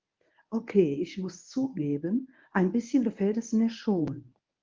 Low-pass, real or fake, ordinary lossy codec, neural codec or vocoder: 7.2 kHz; fake; Opus, 32 kbps; codec, 24 kHz, 0.9 kbps, WavTokenizer, medium speech release version 1